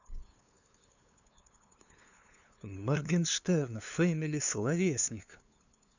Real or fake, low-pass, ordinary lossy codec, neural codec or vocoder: fake; 7.2 kHz; none; codec, 16 kHz, 2 kbps, FunCodec, trained on LibriTTS, 25 frames a second